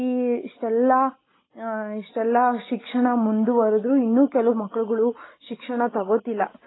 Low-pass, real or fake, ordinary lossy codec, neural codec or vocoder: 7.2 kHz; real; AAC, 16 kbps; none